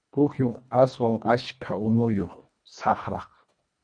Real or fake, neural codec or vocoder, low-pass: fake; codec, 24 kHz, 1.5 kbps, HILCodec; 9.9 kHz